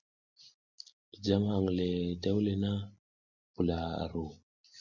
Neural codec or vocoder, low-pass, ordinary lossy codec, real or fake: none; 7.2 kHz; MP3, 64 kbps; real